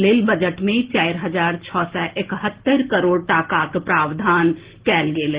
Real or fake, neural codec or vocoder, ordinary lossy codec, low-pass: real; none; Opus, 16 kbps; 3.6 kHz